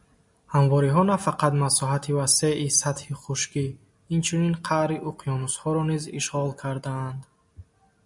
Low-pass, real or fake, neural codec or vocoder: 10.8 kHz; real; none